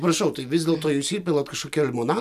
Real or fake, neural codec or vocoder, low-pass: real; none; 14.4 kHz